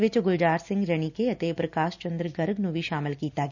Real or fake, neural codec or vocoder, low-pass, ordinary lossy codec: real; none; 7.2 kHz; none